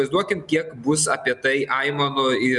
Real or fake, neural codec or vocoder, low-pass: real; none; 10.8 kHz